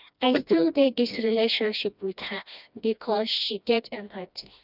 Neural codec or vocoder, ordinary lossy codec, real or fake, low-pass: codec, 16 kHz, 1 kbps, FreqCodec, smaller model; none; fake; 5.4 kHz